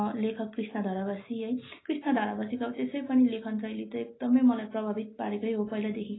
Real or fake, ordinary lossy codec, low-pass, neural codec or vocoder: real; AAC, 16 kbps; 7.2 kHz; none